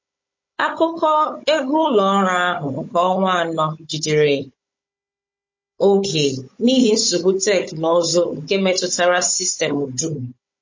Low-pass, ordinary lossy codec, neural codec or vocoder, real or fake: 7.2 kHz; MP3, 32 kbps; codec, 16 kHz, 16 kbps, FunCodec, trained on Chinese and English, 50 frames a second; fake